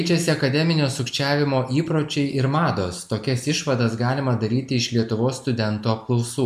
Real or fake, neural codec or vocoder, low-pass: real; none; 14.4 kHz